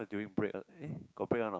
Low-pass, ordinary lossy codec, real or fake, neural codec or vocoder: none; none; fake; codec, 16 kHz, 6 kbps, DAC